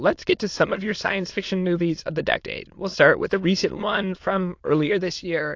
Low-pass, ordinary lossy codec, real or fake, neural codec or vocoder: 7.2 kHz; AAC, 48 kbps; fake; autoencoder, 22.05 kHz, a latent of 192 numbers a frame, VITS, trained on many speakers